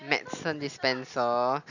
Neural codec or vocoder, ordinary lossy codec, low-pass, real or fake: none; none; 7.2 kHz; real